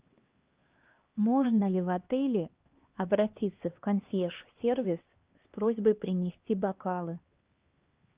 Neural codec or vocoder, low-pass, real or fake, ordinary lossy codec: codec, 16 kHz, 2 kbps, X-Codec, HuBERT features, trained on LibriSpeech; 3.6 kHz; fake; Opus, 32 kbps